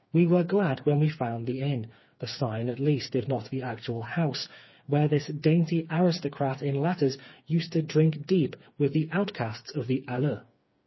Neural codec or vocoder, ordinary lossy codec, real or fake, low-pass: codec, 16 kHz, 4 kbps, FreqCodec, smaller model; MP3, 24 kbps; fake; 7.2 kHz